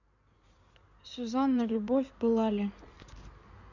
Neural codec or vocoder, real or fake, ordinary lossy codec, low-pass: codec, 16 kHz in and 24 kHz out, 2.2 kbps, FireRedTTS-2 codec; fake; none; 7.2 kHz